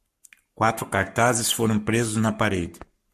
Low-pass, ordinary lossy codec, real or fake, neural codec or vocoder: 14.4 kHz; MP3, 64 kbps; fake; codec, 44.1 kHz, 7.8 kbps, Pupu-Codec